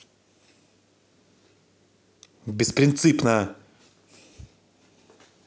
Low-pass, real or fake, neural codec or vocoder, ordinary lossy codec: none; real; none; none